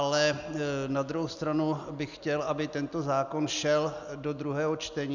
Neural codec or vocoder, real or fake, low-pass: none; real; 7.2 kHz